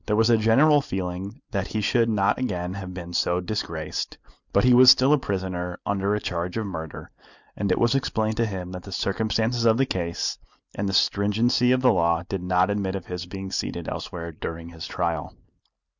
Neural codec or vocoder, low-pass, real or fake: none; 7.2 kHz; real